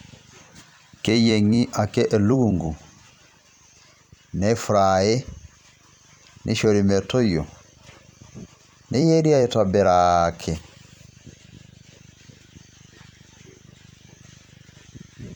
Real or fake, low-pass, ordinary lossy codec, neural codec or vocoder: fake; 19.8 kHz; none; vocoder, 44.1 kHz, 128 mel bands every 256 samples, BigVGAN v2